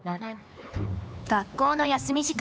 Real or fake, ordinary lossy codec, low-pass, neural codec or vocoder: fake; none; none; codec, 16 kHz, 4 kbps, X-Codec, HuBERT features, trained on LibriSpeech